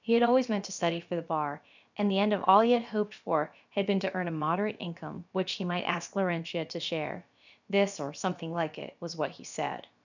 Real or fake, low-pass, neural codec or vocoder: fake; 7.2 kHz; codec, 16 kHz, about 1 kbps, DyCAST, with the encoder's durations